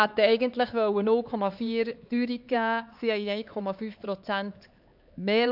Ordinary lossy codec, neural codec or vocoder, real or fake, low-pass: AAC, 48 kbps; codec, 16 kHz, 4 kbps, X-Codec, HuBERT features, trained on LibriSpeech; fake; 5.4 kHz